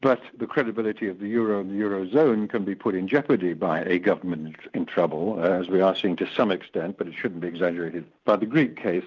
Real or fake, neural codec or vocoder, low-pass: real; none; 7.2 kHz